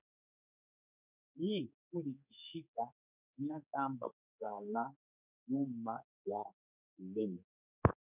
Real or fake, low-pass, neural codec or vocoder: fake; 3.6 kHz; codec, 16 kHz, 4 kbps, X-Codec, HuBERT features, trained on general audio